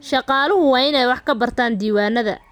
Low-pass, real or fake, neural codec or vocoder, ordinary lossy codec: 19.8 kHz; real; none; none